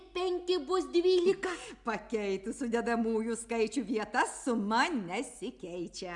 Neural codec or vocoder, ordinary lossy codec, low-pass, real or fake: none; Opus, 64 kbps; 10.8 kHz; real